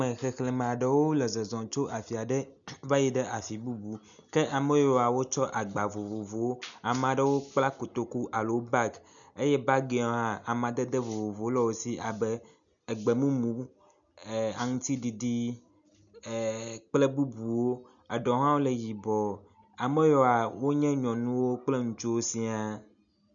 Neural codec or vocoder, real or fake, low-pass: none; real; 7.2 kHz